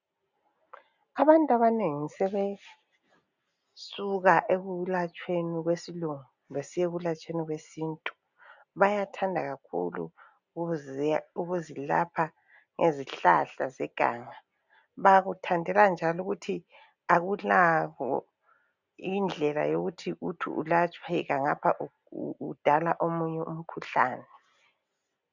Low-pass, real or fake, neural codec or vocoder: 7.2 kHz; real; none